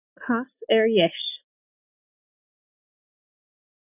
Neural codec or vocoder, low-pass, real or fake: none; 3.6 kHz; real